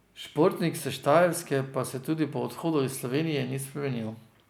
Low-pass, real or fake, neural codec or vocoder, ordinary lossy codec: none; real; none; none